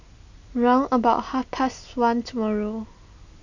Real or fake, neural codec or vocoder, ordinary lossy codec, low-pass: real; none; none; 7.2 kHz